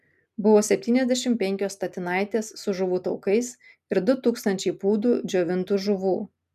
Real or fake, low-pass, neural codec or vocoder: real; 14.4 kHz; none